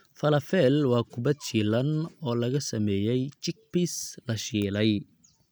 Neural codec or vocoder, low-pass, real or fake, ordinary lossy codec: none; none; real; none